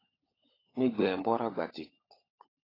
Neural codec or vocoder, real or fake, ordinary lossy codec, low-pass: codec, 16 kHz, 16 kbps, FunCodec, trained on LibriTTS, 50 frames a second; fake; AAC, 24 kbps; 5.4 kHz